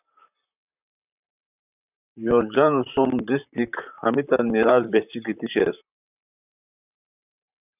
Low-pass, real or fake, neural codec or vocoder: 3.6 kHz; fake; vocoder, 44.1 kHz, 128 mel bands, Pupu-Vocoder